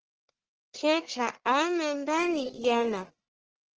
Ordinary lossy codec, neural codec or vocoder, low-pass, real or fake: Opus, 16 kbps; codec, 44.1 kHz, 1.7 kbps, Pupu-Codec; 7.2 kHz; fake